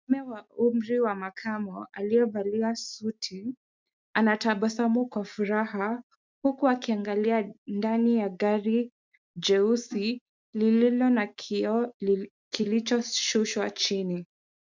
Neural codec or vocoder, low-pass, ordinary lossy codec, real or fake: none; 7.2 kHz; MP3, 64 kbps; real